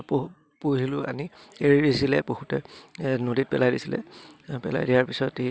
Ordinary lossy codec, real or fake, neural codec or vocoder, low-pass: none; real; none; none